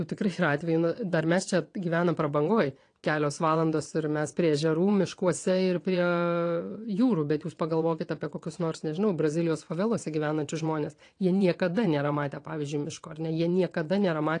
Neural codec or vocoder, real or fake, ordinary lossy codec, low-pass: vocoder, 22.05 kHz, 80 mel bands, WaveNeXt; fake; AAC, 48 kbps; 9.9 kHz